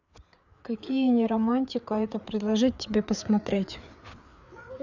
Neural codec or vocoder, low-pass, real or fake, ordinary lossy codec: codec, 16 kHz, 4 kbps, FreqCodec, larger model; 7.2 kHz; fake; none